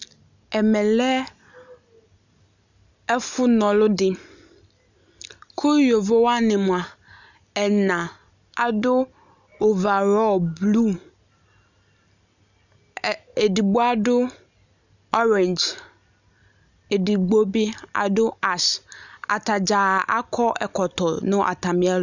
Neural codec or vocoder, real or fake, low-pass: none; real; 7.2 kHz